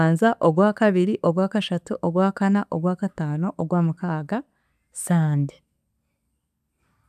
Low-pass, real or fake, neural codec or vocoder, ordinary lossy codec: 14.4 kHz; real; none; none